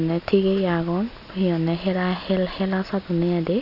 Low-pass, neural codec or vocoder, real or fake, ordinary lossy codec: 5.4 kHz; none; real; none